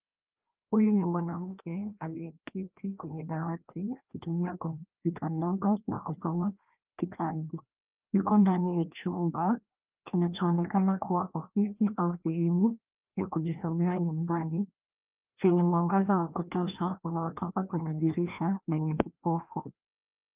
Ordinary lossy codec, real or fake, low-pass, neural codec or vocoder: Opus, 32 kbps; fake; 3.6 kHz; codec, 16 kHz, 1 kbps, FreqCodec, larger model